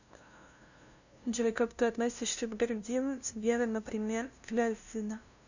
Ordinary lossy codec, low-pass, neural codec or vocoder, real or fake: none; 7.2 kHz; codec, 16 kHz, 0.5 kbps, FunCodec, trained on LibriTTS, 25 frames a second; fake